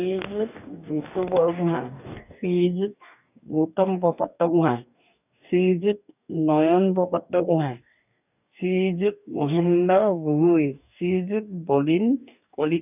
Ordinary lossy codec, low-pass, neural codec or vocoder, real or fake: none; 3.6 kHz; codec, 44.1 kHz, 2.6 kbps, DAC; fake